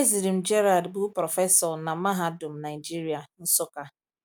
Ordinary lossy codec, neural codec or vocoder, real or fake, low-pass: none; none; real; none